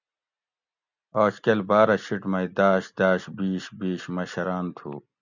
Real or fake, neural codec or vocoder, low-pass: real; none; 7.2 kHz